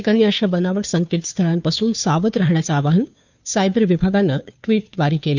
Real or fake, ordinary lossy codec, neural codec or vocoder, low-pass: fake; none; codec, 16 kHz, 2 kbps, FunCodec, trained on Chinese and English, 25 frames a second; 7.2 kHz